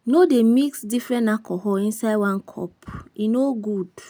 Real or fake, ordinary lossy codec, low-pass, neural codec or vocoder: real; none; none; none